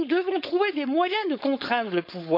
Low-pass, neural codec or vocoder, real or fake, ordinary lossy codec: 5.4 kHz; codec, 16 kHz, 4.8 kbps, FACodec; fake; none